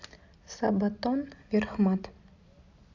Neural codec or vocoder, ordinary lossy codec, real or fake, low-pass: none; AAC, 48 kbps; real; 7.2 kHz